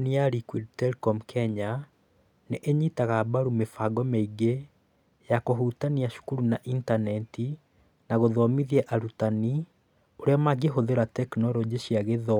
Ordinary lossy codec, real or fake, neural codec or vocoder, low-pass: none; real; none; 19.8 kHz